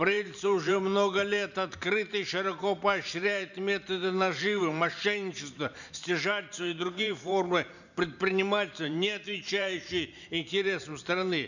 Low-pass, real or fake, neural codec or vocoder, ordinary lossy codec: 7.2 kHz; fake; vocoder, 44.1 kHz, 128 mel bands every 512 samples, BigVGAN v2; none